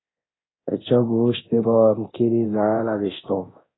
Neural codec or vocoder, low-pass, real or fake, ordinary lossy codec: codec, 24 kHz, 0.9 kbps, DualCodec; 7.2 kHz; fake; AAC, 16 kbps